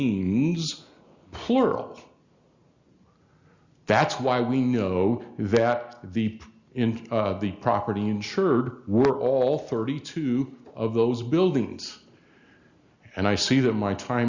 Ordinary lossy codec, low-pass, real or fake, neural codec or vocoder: Opus, 64 kbps; 7.2 kHz; real; none